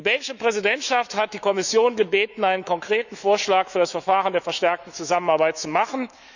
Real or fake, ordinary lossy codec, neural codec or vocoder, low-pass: fake; none; autoencoder, 48 kHz, 128 numbers a frame, DAC-VAE, trained on Japanese speech; 7.2 kHz